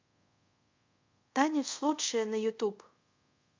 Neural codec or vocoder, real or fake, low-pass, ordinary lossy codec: codec, 24 kHz, 0.5 kbps, DualCodec; fake; 7.2 kHz; MP3, 48 kbps